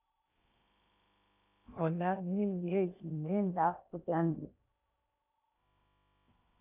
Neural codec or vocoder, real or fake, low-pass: codec, 16 kHz in and 24 kHz out, 0.8 kbps, FocalCodec, streaming, 65536 codes; fake; 3.6 kHz